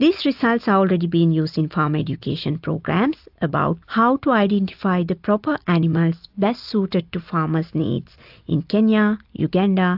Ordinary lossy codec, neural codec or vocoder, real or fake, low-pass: AAC, 48 kbps; none; real; 5.4 kHz